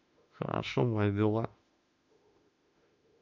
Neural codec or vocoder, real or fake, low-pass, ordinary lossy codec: autoencoder, 48 kHz, 32 numbers a frame, DAC-VAE, trained on Japanese speech; fake; 7.2 kHz; none